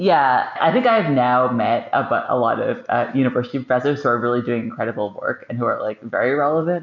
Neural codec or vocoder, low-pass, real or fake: none; 7.2 kHz; real